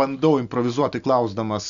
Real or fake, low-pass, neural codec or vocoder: real; 7.2 kHz; none